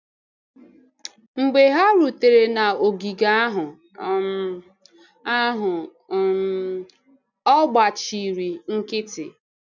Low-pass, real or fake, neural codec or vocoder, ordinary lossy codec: 7.2 kHz; real; none; none